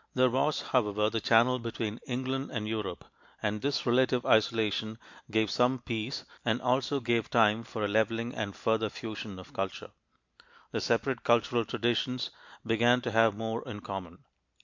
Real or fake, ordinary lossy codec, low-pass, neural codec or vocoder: real; MP3, 64 kbps; 7.2 kHz; none